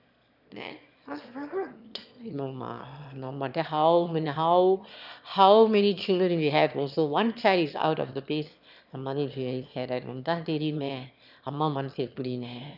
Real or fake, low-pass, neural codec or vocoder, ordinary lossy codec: fake; 5.4 kHz; autoencoder, 22.05 kHz, a latent of 192 numbers a frame, VITS, trained on one speaker; none